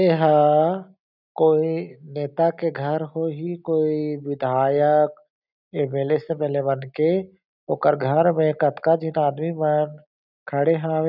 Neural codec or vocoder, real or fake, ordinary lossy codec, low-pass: none; real; none; 5.4 kHz